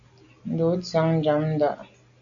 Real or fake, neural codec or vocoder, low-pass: real; none; 7.2 kHz